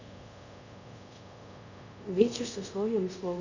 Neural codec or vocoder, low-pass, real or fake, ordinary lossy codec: codec, 24 kHz, 0.5 kbps, DualCodec; 7.2 kHz; fake; none